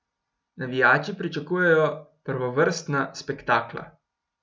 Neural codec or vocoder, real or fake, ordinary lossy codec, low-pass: none; real; none; none